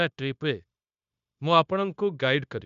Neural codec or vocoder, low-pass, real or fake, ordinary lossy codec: codec, 16 kHz, 0.9 kbps, LongCat-Audio-Codec; 7.2 kHz; fake; none